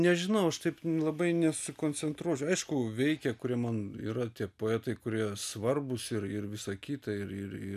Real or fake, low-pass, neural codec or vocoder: real; 14.4 kHz; none